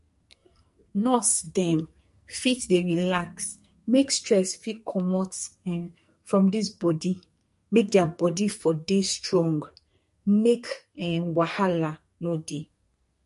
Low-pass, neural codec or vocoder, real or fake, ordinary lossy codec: 14.4 kHz; codec, 44.1 kHz, 2.6 kbps, SNAC; fake; MP3, 48 kbps